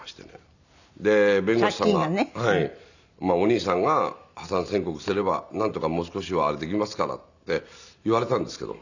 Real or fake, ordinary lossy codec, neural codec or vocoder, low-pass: real; none; none; 7.2 kHz